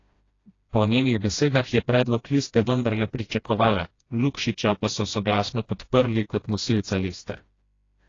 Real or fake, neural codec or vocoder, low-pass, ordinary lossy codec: fake; codec, 16 kHz, 1 kbps, FreqCodec, smaller model; 7.2 kHz; AAC, 32 kbps